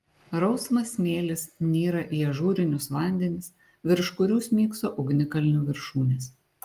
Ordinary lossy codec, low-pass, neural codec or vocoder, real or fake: Opus, 32 kbps; 14.4 kHz; none; real